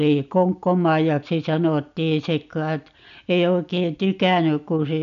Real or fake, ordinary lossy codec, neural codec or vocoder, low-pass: real; none; none; 7.2 kHz